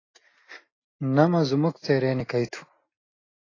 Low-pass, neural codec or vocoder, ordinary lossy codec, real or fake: 7.2 kHz; none; AAC, 32 kbps; real